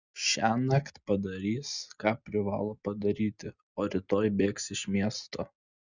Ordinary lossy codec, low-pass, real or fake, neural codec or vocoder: Opus, 64 kbps; 7.2 kHz; real; none